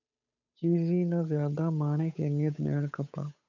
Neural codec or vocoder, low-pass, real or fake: codec, 16 kHz, 8 kbps, FunCodec, trained on Chinese and English, 25 frames a second; 7.2 kHz; fake